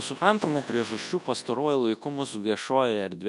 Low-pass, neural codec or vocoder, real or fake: 10.8 kHz; codec, 24 kHz, 0.9 kbps, WavTokenizer, large speech release; fake